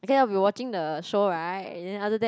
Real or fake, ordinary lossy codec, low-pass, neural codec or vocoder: real; none; none; none